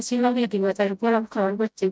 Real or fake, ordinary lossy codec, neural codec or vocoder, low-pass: fake; none; codec, 16 kHz, 0.5 kbps, FreqCodec, smaller model; none